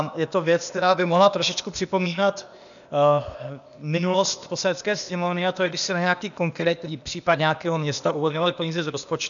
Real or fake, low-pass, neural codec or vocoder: fake; 7.2 kHz; codec, 16 kHz, 0.8 kbps, ZipCodec